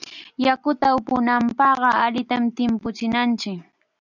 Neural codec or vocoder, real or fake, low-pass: none; real; 7.2 kHz